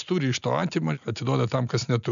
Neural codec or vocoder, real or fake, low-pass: none; real; 7.2 kHz